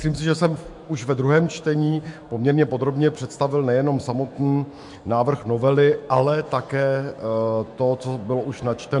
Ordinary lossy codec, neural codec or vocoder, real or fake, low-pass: MP3, 64 kbps; autoencoder, 48 kHz, 128 numbers a frame, DAC-VAE, trained on Japanese speech; fake; 10.8 kHz